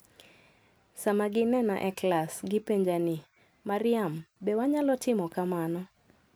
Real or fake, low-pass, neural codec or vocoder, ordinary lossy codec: real; none; none; none